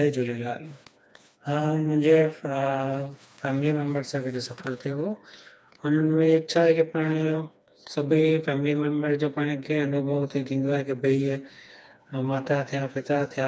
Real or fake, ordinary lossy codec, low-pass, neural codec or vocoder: fake; none; none; codec, 16 kHz, 2 kbps, FreqCodec, smaller model